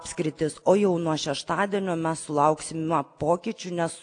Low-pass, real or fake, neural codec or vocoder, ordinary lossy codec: 9.9 kHz; real; none; AAC, 48 kbps